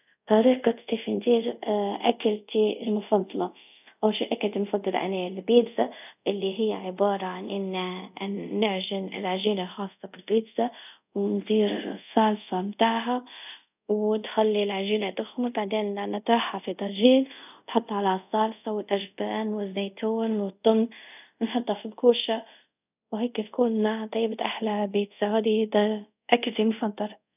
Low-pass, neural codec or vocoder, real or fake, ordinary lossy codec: 3.6 kHz; codec, 24 kHz, 0.5 kbps, DualCodec; fake; none